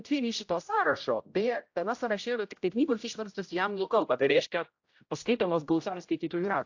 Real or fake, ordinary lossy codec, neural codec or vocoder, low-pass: fake; AAC, 48 kbps; codec, 16 kHz, 0.5 kbps, X-Codec, HuBERT features, trained on general audio; 7.2 kHz